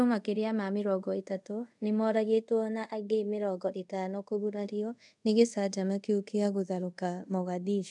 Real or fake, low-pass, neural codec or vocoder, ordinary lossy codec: fake; 10.8 kHz; codec, 24 kHz, 0.5 kbps, DualCodec; none